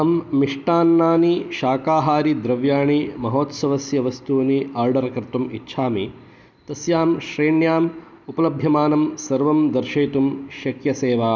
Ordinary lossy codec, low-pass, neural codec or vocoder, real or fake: none; none; none; real